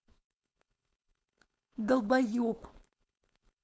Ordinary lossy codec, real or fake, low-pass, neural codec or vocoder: none; fake; none; codec, 16 kHz, 4.8 kbps, FACodec